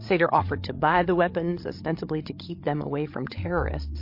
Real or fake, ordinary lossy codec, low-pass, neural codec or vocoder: fake; MP3, 32 kbps; 5.4 kHz; codec, 16 kHz, 16 kbps, FreqCodec, larger model